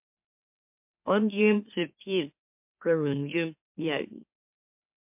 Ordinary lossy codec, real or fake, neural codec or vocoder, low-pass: MP3, 32 kbps; fake; autoencoder, 44.1 kHz, a latent of 192 numbers a frame, MeloTTS; 3.6 kHz